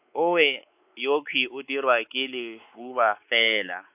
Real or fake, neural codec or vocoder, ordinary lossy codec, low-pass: fake; codec, 16 kHz, 4 kbps, X-Codec, WavLM features, trained on Multilingual LibriSpeech; AAC, 32 kbps; 3.6 kHz